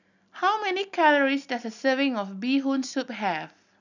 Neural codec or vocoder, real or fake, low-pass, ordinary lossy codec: none; real; 7.2 kHz; none